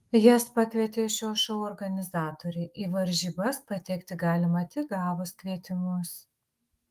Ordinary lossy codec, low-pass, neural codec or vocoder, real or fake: Opus, 24 kbps; 14.4 kHz; autoencoder, 48 kHz, 128 numbers a frame, DAC-VAE, trained on Japanese speech; fake